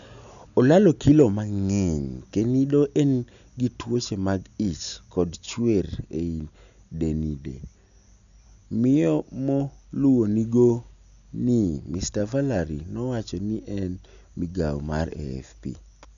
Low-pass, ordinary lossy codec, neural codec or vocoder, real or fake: 7.2 kHz; none; none; real